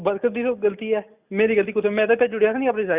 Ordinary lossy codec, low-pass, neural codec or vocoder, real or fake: Opus, 64 kbps; 3.6 kHz; none; real